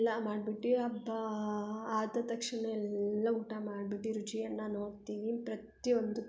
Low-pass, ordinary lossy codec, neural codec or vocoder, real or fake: none; none; none; real